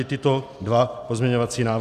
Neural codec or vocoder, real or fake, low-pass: none; real; 14.4 kHz